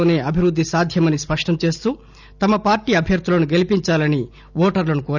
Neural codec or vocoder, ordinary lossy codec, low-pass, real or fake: none; none; 7.2 kHz; real